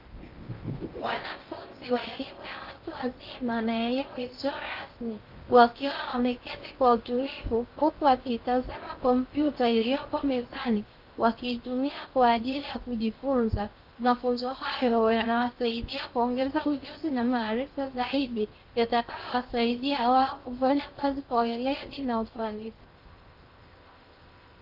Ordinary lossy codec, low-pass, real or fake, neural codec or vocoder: Opus, 24 kbps; 5.4 kHz; fake; codec, 16 kHz in and 24 kHz out, 0.6 kbps, FocalCodec, streaming, 2048 codes